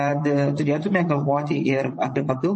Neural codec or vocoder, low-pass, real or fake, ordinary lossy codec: vocoder, 22.05 kHz, 80 mel bands, Vocos; 9.9 kHz; fake; MP3, 32 kbps